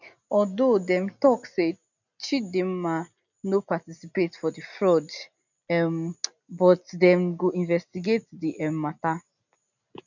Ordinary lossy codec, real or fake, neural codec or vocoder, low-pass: none; real; none; 7.2 kHz